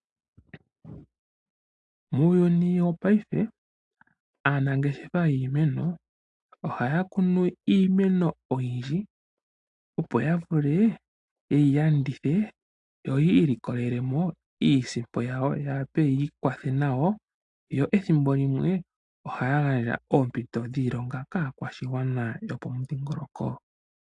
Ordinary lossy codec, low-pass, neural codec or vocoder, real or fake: AAC, 64 kbps; 10.8 kHz; none; real